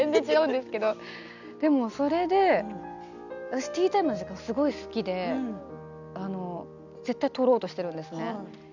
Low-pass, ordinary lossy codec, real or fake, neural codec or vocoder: 7.2 kHz; none; real; none